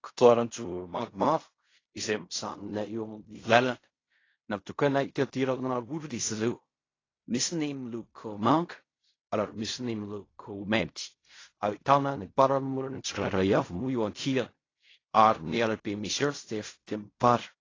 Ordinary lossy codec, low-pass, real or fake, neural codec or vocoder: AAC, 32 kbps; 7.2 kHz; fake; codec, 16 kHz in and 24 kHz out, 0.4 kbps, LongCat-Audio-Codec, fine tuned four codebook decoder